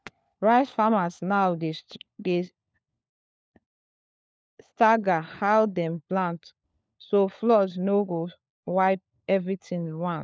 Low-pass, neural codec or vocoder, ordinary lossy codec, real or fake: none; codec, 16 kHz, 4 kbps, FunCodec, trained on LibriTTS, 50 frames a second; none; fake